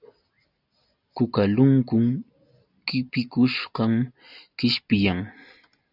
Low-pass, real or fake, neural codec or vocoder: 5.4 kHz; real; none